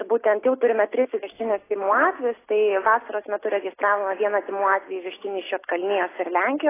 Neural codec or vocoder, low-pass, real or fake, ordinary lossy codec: none; 3.6 kHz; real; AAC, 16 kbps